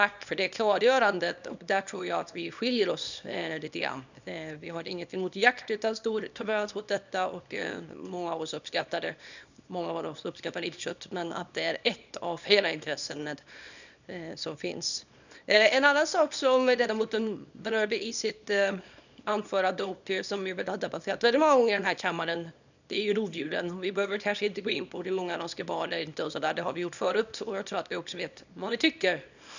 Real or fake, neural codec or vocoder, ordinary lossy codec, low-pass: fake; codec, 24 kHz, 0.9 kbps, WavTokenizer, small release; none; 7.2 kHz